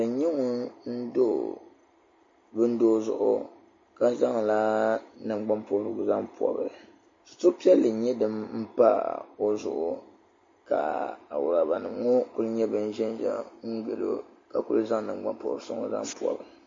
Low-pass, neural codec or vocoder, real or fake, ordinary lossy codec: 7.2 kHz; none; real; MP3, 32 kbps